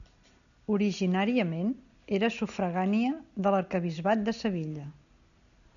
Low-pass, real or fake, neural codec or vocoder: 7.2 kHz; real; none